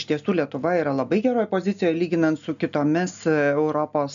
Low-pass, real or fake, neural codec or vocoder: 7.2 kHz; real; none